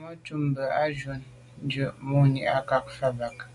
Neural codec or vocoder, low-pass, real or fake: none; 10.8 kHz; real